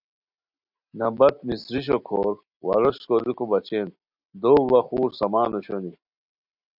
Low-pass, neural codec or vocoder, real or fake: 5.4 kHz; none; real